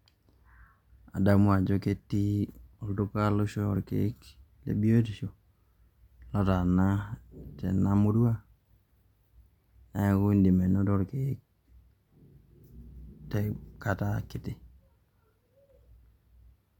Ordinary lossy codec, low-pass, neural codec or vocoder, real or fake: MP3, 96 kbps; 19.8 kHz; none; real